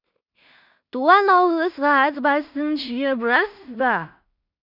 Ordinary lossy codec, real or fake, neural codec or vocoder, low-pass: none; fake; codec, 16 kHz in and 24 kHz out, 0.4 kbps, LongCat-Audio-Codec, two codebook decoder; 5.4 kHz